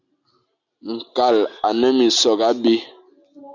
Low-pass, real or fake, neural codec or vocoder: 7.2 kHz; real; none